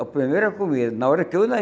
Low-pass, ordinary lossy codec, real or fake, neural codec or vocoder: none; none; real; none